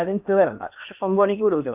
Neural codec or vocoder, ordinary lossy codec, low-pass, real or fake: codec, 16 kHz in and 24 kHz out, 0.8 kbps, FocalCodec, streaming, 65536 codes; AAC, 32 kbps; 3.6 kHz; fake